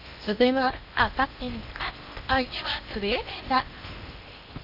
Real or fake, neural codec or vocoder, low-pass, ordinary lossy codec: fake; codec, 16 kHz in and 24 kHz out, 0.6 kbps, FocalCodec, streaming, 2048 codes; 5.4 kHz; none